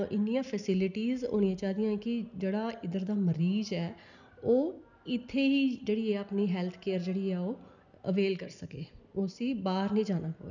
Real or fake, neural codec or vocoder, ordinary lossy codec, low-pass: real; none; none; 7.2 kHz